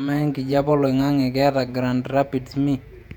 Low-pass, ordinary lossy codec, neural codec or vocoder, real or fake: 19.8 kHz; none; vocoder, 48 kHz, 128 mel bands, Vocos; fake